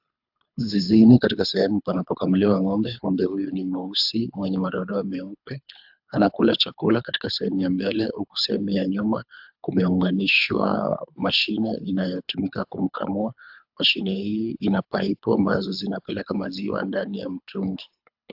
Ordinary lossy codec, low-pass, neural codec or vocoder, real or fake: AAC, 48 kbps; 5.4 kHz; codec, 24 kHz, 3 kbps, HILCodec; fake